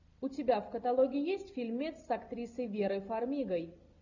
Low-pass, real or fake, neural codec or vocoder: 7.2 kHz; real; none